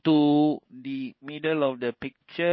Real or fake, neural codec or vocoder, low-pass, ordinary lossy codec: fake; codec, 16 kHz in and 24 kHz out, 1 kbps, XY-Tokenizer; 7.2 kHz; MP3, 24 kbps